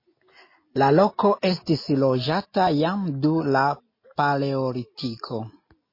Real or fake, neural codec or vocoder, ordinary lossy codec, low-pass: real; none; MP3, 24 kbps; 5.4 kHz